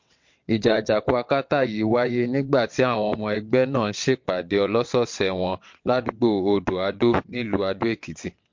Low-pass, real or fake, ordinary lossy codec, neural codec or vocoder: 7.2 kHz; fake; MP3, 48 kbps; vocoder, 22.05 kHz, 80 mel bands, WaveNeXt